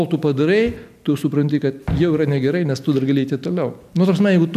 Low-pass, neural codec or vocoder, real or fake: 14.4 kHz; none; real